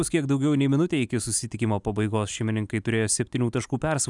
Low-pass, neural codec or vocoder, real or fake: 10.8 kHz; none; real